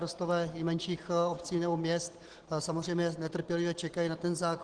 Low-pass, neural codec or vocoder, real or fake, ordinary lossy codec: 9.9 kHz; none; real; Opus, 16 kbps